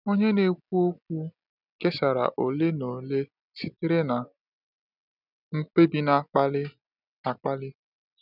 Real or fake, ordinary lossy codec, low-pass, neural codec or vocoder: real; none; 5.4 kHz; none